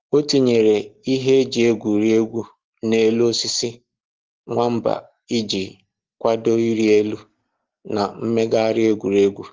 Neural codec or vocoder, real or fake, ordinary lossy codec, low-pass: none; real; Opus, 16 kbps; 7.2 kHz